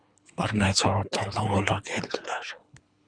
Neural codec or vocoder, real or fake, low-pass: codec, 24 kHz, 3 kbps, HILCodec; fake; 9.9 kHz